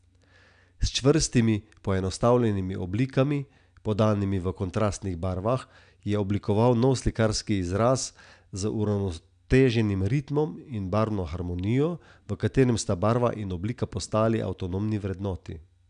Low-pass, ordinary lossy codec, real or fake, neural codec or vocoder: 9.9 kHz; none; real; none